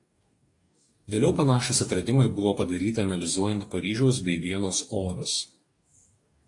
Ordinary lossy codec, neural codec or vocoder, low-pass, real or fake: AAC, 48 kbps; codec, 44.1 kHz, 2.6 kbps, DAC; 10.8 kHz; fake